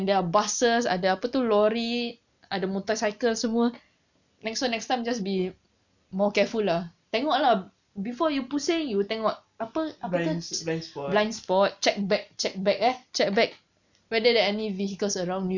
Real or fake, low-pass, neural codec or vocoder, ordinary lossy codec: real; 7.2 kHz; none; none